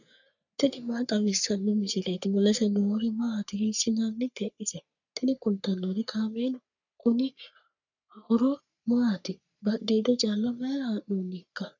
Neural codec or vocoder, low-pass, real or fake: codec, 44.1 kHz, 3.4 kbps, Pupu-Codec; 7.2 kHz; fake